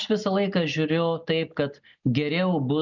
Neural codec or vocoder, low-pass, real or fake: none; 7.2 kHz; real